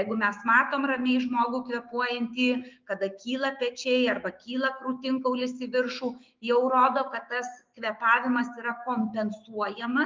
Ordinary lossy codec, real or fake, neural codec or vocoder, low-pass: Opus, 32 kbps; fake; autoencoder, 48 kHz, 128 numbers a frame, DAC-VAE, trained on Japanese speech; 7.2 kHz